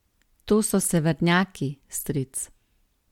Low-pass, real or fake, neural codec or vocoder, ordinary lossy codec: 19.8 kHz; real; none; MP3, 96 kbps